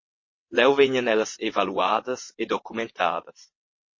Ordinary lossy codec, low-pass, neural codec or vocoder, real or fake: MP3, 32 kbps; 7.2 kHz; vocoder, 22.05 kHz, 80 mel bands, WaveNeXt; fake